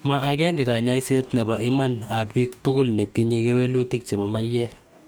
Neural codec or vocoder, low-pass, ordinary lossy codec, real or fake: codec, 44.1 kHz, 2.6 kbps, DAC; none; none; fake